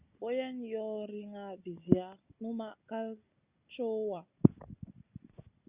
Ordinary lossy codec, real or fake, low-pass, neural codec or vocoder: Opus, 64 kbps; real; 3.6 kHz; none